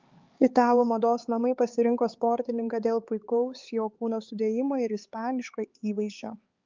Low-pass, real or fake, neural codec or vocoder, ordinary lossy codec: 7.2 kHz; fake; codec, 16 kHz, 4 kbps, X-Codec, HuBERT features, trained on LibriSpeech; Opus, 32 kbps